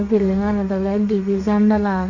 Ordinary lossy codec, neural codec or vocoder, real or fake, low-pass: none; codec, 32 kHz, 1.9 kbps, SNAC; fake; 7.2 kHz